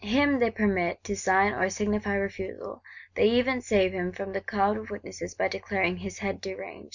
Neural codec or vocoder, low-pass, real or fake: none; 7.2 kHz; real